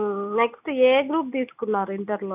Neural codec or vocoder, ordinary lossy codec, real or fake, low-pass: none; none; real; 3.6 kHz